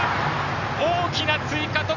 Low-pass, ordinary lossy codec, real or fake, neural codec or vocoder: 7.2 kHz; none; real; none